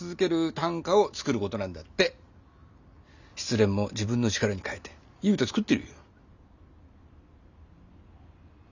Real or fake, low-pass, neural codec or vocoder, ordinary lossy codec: real; 7.2 kHz; none; none